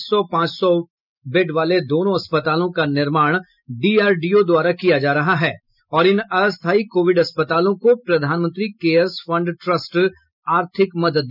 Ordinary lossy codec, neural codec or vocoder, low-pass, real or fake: none; none; 5.4 kHz; real